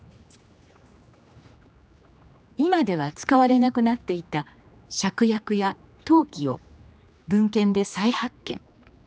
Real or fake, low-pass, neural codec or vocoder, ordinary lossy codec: fake; none; codec, 16 kHz, 2 kbps, X-Codec, HuBERT features, trained on general audio; none